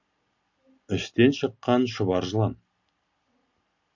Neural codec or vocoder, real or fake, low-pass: none; real; 7.2 kHz